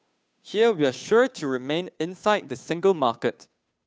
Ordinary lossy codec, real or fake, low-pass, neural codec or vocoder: none; fake; none; codec, 16 kHz, 2 kbps, FunCodec, trained on Chinese and English, 25 frames a second